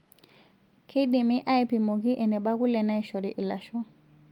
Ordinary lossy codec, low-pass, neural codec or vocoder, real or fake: Opus, 32 kbps; 19.8 kHz; none; real